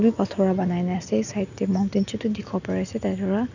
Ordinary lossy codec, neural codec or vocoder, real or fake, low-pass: none; none; real; 7.2 kHz